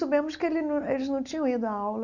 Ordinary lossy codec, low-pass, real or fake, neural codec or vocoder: none; 7.2 kHz; real; none